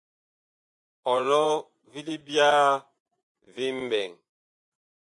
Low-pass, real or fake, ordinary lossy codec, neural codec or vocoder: 10.8 kHz; fake; AAC, 64 kbps; vocoder, 24 kHz, 100 mel bands, Vocos